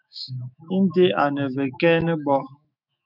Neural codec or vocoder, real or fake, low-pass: autoencoder, 48 kHz, 128 numbers a frame, DAC-VAE, trained on Japanese speech; fake; 5.4 kHz